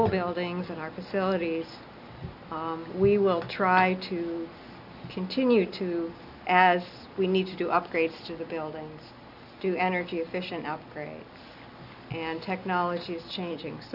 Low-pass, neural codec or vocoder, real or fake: 5.4 kHz; none; real